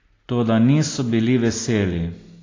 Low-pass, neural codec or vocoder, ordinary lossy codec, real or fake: 7.2 kHz; none; AAC, 32 kbps; real